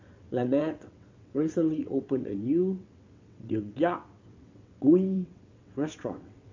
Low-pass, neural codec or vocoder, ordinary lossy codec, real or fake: 7.2 kHz; vocoder, 22.05 kHz, 80 mel bands, WaveNeXt; MP3, 48 kbps; fake